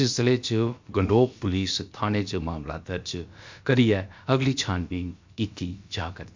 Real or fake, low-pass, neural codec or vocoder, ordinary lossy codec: fake; 7.2 kHz; codec, 16 kHz, about 1 kbps, DyCAST, with the encoder's durations; MP3, 64 kbps